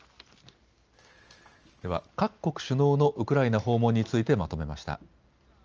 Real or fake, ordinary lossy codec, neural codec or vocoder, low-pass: real; Opus, 24 kbps; none; 7.2 kHz